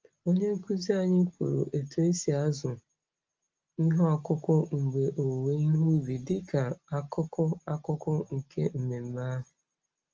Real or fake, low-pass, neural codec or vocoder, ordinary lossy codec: real; 7.2 kHz; none; Opus, 24 kbps